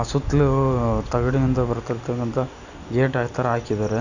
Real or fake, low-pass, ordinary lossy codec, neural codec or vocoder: real; 7.2 kHz; none; none